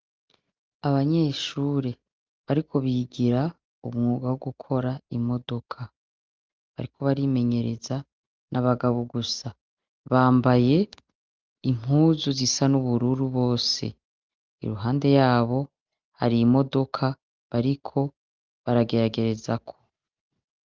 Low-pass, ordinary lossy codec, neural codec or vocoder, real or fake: 7.2 kHz; Opus, 32 kbps; none; real